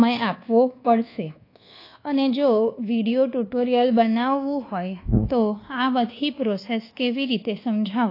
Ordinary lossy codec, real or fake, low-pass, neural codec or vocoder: AAC, 32 kbps; fake; 5.4 kHz; codec, 24 kHz, 1.2 kbps, DualCodec